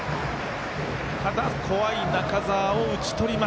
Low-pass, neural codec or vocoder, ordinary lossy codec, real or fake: none; none; none; real